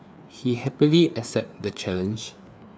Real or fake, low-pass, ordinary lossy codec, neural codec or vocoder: fake; none; none; codec, 16 kHz, 4 kbps, FreqCodec, larger model